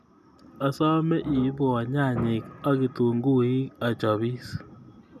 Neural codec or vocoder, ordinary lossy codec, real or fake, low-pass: none; none; real; 14.4 kHz